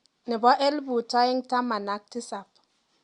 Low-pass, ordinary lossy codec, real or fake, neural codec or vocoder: 10.8 kHz; Opus, 64 kbps; real; none